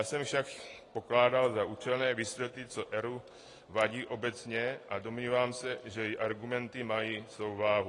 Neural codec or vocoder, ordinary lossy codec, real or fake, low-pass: none; AAC, 32 kbps; real; 10.8 kHz